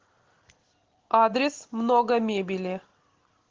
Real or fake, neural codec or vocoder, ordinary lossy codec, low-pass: real; none; Opus, 16 kbps; 7.2 kHz